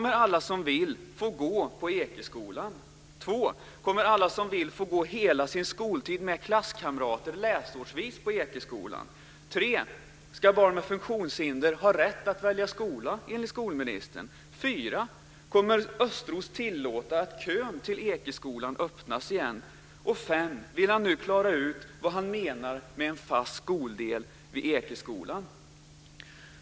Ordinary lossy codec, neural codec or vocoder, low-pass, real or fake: none; none; none; real